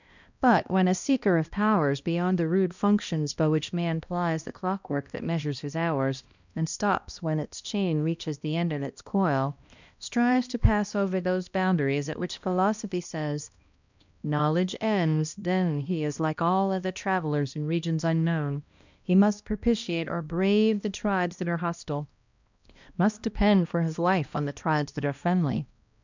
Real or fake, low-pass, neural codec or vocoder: fake; 7.2 kHz; codec, 16 kHz, 1 kbps, X-Codec, HuBERT features, trained on balanced general audio